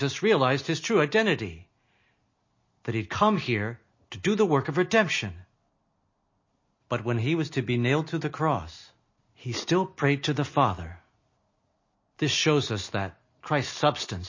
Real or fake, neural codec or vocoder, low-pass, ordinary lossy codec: real; none; 7.2 kHz; MP3, 32 kbps